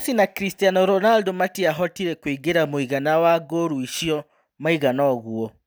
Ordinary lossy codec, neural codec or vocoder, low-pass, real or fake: none; none; none; real